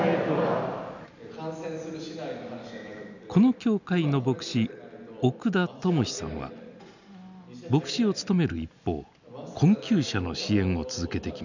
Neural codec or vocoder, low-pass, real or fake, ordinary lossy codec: none; 7.2 kHz; real; none